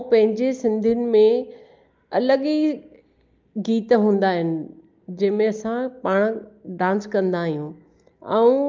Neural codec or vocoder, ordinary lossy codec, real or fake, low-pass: none; Opus, 24 kbps; real; 7.2 kHz